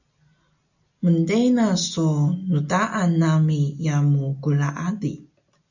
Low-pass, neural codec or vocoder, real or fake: 7.2 kHz; none; real